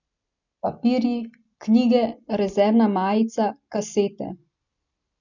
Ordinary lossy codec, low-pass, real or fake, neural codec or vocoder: none; 7.2 kHz; real; none